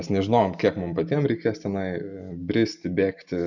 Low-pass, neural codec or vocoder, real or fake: 7.2 kHz; none; real